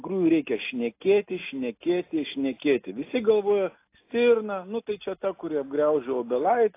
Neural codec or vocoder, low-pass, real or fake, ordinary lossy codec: none; 3.6 kHz; real; AAC, 24 kbps